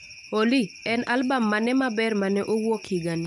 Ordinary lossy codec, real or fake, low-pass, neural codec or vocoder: none; real; 10.8 kHz; none